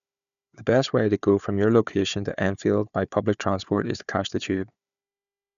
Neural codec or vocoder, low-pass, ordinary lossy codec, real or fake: codec, 16 kHz, 4 kbps, FunCodec, trained on Chinese and English, 50 frames a second; 7.2 kHz; none; fake